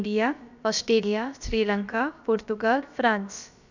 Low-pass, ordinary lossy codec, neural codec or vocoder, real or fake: 7.2 kHz; none; codec, 16 kHz, about 1 kbps, DyCAST, with the encoder's durations; fake